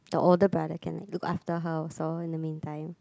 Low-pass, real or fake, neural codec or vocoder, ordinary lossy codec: none; real; none; none